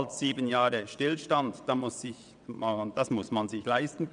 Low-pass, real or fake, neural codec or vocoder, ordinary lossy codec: 9.9 kHz; fake; vocoder, 22.05 kHz, 80 mel bands, WaveNeXt; none